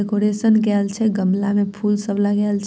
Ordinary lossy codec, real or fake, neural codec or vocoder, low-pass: none; real; none; none